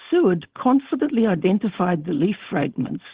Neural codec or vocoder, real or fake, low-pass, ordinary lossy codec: codec, 16 kHz, 4.8 kbps, FACodec; fake; 3.6 kHz; Opus, 16 kbps